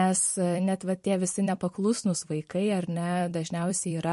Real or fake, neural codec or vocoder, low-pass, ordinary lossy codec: fake; vocoder, 44.1 kHz, 128 mel bands every 256 samples, BigVGAN v2; 14.4 kHz; MP3, 48 kbps